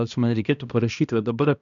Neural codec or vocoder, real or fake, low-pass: codec, 16 kHz, 1 kbps, X-Codec, HuBERT features, trained on balanced general audio; fake; 7.2 kHz